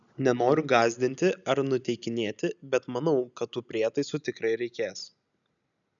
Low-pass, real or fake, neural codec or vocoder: 7.2 kHz; real; none